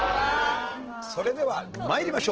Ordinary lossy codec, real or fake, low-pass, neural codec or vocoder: Opus, 16 kbps; real; 7.2 kHz; none